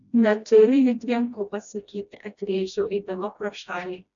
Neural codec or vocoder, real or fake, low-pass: codec, 16 kHz, 1 kbps, FreqCodec, smaller model; fake; 7.2 kHz